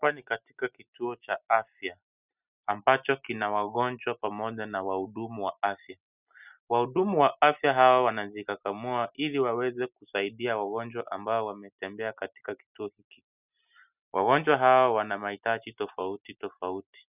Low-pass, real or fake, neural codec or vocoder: 3.6 kHz; real; none